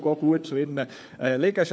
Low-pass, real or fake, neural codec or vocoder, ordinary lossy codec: none; fake; codec, 16 kHz, 4 kbps, FunCodec, trained on LibriTTS, 50 frames a second; none